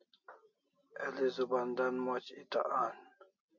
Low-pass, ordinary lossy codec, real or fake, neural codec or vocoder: 7.2 kHz; MP3, 48 kbps; real; none